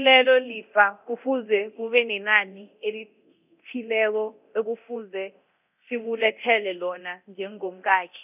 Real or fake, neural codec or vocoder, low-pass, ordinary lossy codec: fake; codec, 24 kHz, 0.9 kbps, DualCodec; 3.6 kHz; none